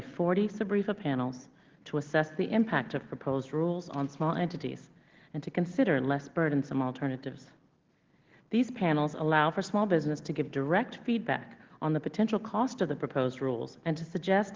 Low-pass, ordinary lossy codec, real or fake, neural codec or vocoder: 7.2 kHz; Opus, 16 kbps; real; none